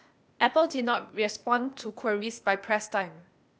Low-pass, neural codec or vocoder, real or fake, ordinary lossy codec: none; codec, 16 kHz, 0.8 kbps, ZipCodec; fake; none